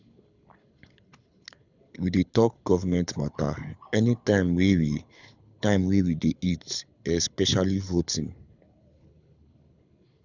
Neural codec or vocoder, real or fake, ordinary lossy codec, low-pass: codec, 24 kHz, 6 kbps, HILCodec; fake; none; 7.2 kHz